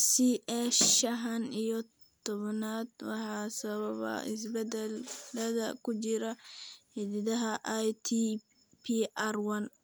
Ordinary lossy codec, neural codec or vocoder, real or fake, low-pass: none; none; real; none